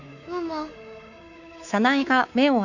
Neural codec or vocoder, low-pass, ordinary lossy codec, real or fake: codec, 24 kHz, 3.1 kbps, DualCodec; 7.2 kHz; none; fake